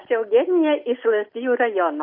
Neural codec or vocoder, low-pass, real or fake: none; 5.4 kHz; real